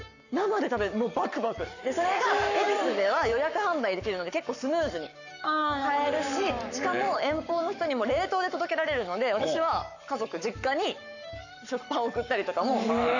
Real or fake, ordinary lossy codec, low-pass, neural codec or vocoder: fake; none; 7.2 kHz; codec, 44.1 kHz, 7.8 kbps, Pupu-Codec